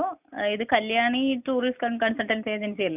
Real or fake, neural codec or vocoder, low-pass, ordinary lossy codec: real; none; 3.6 kHz; none